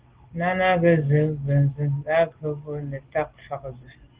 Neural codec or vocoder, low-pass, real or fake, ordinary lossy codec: none; 3.6 kHz; real; Opus, 16 kbps